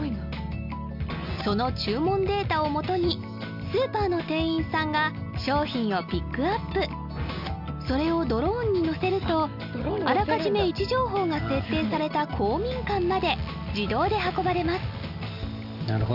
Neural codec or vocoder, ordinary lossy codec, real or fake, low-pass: none; none; real; 5.4 kHz